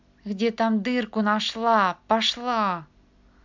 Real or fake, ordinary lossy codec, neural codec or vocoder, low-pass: real; MP3, 64 kbps; none; 7.2 kHz